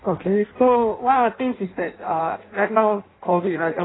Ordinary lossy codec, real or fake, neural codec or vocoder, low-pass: AAC, 16 kbps; fake; codec, 16 kHz in and 24 kHz out, 0.6 kbps, FireRedTTS-2 codec; 7.2 kHz